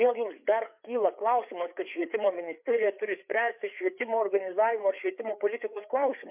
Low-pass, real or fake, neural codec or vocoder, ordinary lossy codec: 3.6 kHz; fake; codec, 16 kHz, 4 kbps, FreqCodec, larger model; MP3, 32 kbps